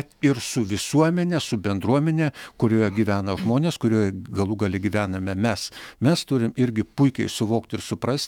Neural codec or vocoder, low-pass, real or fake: autoencoder, 48 kHz, 128 numbers a frame, DAC-VAE, trained on Japanese speech; 19.8 kHz; fake